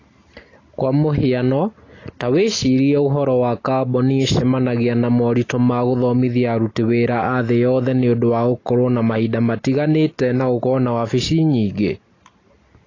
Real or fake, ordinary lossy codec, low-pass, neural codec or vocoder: real; AAC, 32 kbps; 7.2 kHz; none